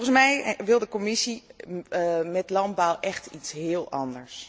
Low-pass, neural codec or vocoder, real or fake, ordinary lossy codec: none; none; real; none